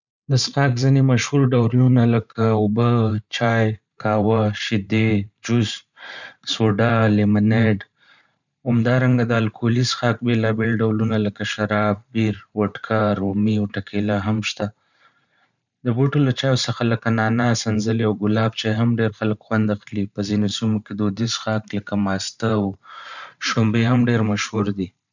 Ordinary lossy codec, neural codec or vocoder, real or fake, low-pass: none; vocoder, 44.1 kHz, 128 mel bands every 512 samples, BigVGAN v2; fake; 7.2 kHz